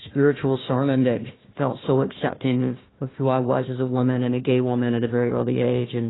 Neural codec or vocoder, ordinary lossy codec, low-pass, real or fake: codec, 16 kHz, 1 kbps, FunCodec, trained on Chinese and English, 50 frames a second; AAC, 16 kbps; 7.2 kHz; fake